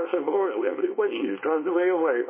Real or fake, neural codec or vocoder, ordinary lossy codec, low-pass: fake; codec, 24 kHz, 0.9 kbps, WavTokenizer, small release; MP3, 16 kbps; 3.6 kHz